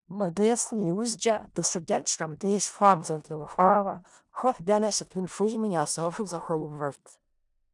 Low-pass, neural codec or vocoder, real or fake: 10.8 kHz; codec, 16 kHz in and 24 kHz out, 0.4 kbps, LongCat-Audio-Codec, four codebook decoder; fake